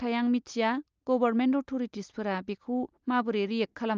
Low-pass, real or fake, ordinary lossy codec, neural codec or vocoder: 7.2 kHz; real; Opus, 24 kbps; none